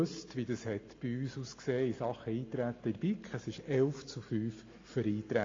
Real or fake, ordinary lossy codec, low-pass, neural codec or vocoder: real; AAC, 32 kbps; 7.2 kHz; none